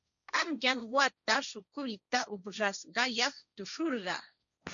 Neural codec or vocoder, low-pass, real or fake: codec, 16 kHz, 1.1 kbps, Voila-Tokenizer; 7.2 kHz; fake